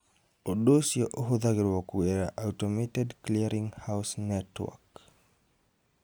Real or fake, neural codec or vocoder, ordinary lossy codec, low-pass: real; none; none; none